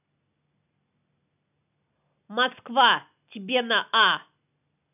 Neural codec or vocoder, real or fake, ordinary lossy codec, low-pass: none; real; none; 3.6 kHz